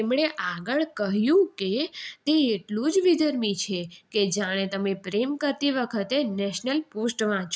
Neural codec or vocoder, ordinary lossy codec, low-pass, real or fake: none; none; none; real